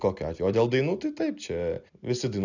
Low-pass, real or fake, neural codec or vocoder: 7.2 kHz; real; none